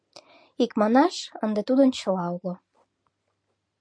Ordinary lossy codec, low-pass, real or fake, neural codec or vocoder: MP3, 64 kbps; 9.9 kHz; real; none